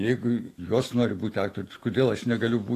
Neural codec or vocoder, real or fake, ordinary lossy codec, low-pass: autoencoder, 48 kHz, 128 numbers a frame, DAC-VAE, trained on Japanese speech; fake; AAC, 48 kbps; 14.4 kHz